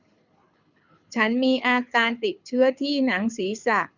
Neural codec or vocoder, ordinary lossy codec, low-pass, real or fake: codec, 24 kHz, 6 kbps, HILCodec; none; 7.2 kHz; fake